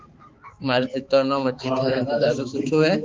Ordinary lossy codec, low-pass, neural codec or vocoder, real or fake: Opus, 24 kbps; 7.2 kHz; codec, 16 kHz, 4 kbps, X-Codec, HuBERT features, trained on balanced general audio; fake